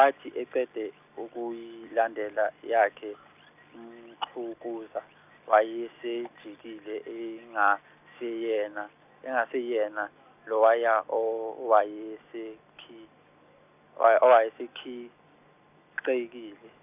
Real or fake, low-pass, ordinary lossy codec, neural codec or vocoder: real; 3.6 kHz; none; none